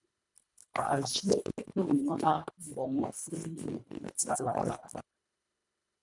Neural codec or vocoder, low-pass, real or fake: codec, 24 kHz, 1.5 kbps, HILCodec; 10.8 kHz; fake